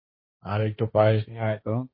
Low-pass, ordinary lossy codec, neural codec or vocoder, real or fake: 5.4 kHz; MP3, 24 kbps; codec, 16 kHz, 1.1 kbps, Voila-Tokenizer; fake